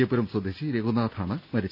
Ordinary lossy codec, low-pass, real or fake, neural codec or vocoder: none; 5.4 kHz; real; none